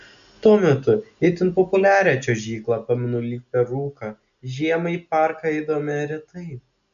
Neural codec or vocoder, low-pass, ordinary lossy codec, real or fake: none; 7.2 kHz; AAC, 96 kbps; real